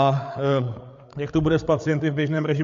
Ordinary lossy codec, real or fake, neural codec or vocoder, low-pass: AAC, 64 kbps; fake; codec, 16 kHz, 8 kbps, FreqCodec, larger model; 7.2 kHz